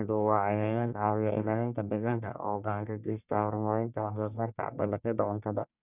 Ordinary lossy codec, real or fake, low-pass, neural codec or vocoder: none; fake; 3.6 kHz; codec, 44.1 kHz, 1.7 kbps, Pupu-Codec